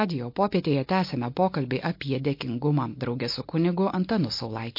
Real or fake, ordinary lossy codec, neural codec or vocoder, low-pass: real; MP3, 32 kbps; none; 5.4 kHz